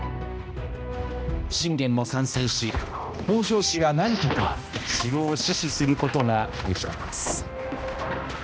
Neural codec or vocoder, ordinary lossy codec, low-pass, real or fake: codec, 16 kHz, 1 kbps, X-Codec, HuBERT features, trained on balanced general audio; none; none; fake